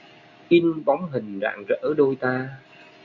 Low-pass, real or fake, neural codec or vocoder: 7.2 kHz; real; none